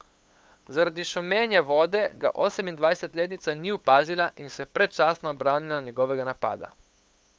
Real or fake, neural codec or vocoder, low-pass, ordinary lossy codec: fake; codec, 16 kHz, 8 kbps, FunCodec, trained on LibriTTS, 25 frames a second; none; none